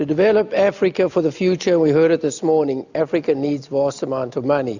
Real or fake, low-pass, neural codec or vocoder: real; 7.2 kHz; none